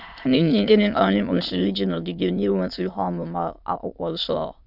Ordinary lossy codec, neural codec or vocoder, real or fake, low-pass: none; autoencoder, 22.05 kHz, a latent of 192 numbers a frame, VITS, trained on many speakers; fake; 5.4 kHz